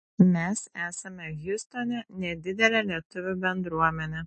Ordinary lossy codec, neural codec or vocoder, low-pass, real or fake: MP3, 32 kbps; none; 10.8 kHz; real